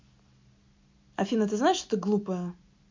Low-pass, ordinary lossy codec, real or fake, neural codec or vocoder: 7.2 kHz; MP3, 48 kbps; real; none